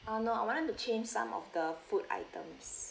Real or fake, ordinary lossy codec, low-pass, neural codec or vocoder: real; none; none; none